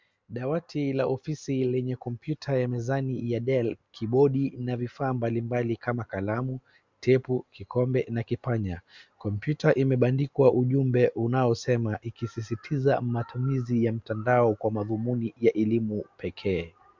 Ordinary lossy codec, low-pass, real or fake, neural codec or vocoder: MP3, 64 kbps; 7.2 kHz; real; none